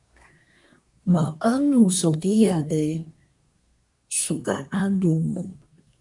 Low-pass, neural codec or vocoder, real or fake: 10.8 kHz; codec, 24 kHz, 1 kbps, SNAC; fake